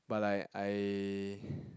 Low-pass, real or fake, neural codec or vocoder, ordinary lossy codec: none; real; none; none